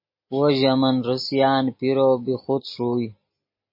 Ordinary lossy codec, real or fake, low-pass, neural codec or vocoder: MP3, 24 kbps; real; 5.4 kHz; none